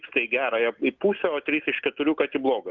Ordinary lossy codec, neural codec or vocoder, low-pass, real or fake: Opus, 32 kbps; none; 7.2 kHz; real